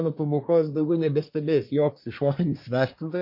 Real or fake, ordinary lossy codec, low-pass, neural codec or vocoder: fake; MP3, 32 kbps; 5.4 kHz; codec, 24 kHz, 1 kbps, SNAC